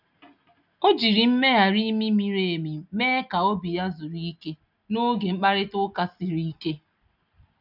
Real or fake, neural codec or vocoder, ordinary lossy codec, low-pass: real; none; none; 5.4 kHz